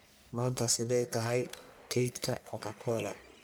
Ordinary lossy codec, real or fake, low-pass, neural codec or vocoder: none; fake; none; codec, 44.1 kHz, 1.7 kbps, Pupu-Codec